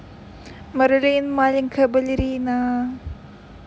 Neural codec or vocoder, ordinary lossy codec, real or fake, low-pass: none; none; real; none